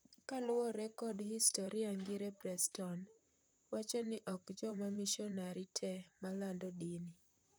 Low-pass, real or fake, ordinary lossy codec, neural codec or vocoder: none; fake; none; vocoder, 44.1 kHz, 128 mel bands, Pupu-Vocoder